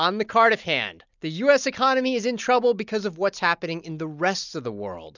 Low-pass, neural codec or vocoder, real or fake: 7.2 kHz; none; real